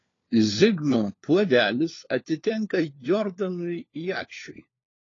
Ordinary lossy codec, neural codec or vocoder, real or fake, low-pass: AAC, 32 kbps; codec, 16 kHz, 4 kbps, FunCodec, trained on LibriTTS, 50 frames a second; fake; 7.2 kHz